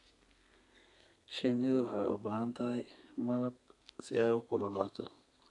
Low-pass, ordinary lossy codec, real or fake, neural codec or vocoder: 10.8 kHz; none; fake; codec, 32 kHz, 1.9 kbps, SNAC